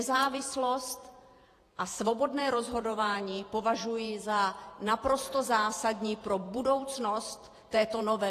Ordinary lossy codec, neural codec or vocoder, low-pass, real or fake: AAC, 48 kbps; vocoder, 48 kHz, 128 mel bands, Vocos; 14.4 kHz; fake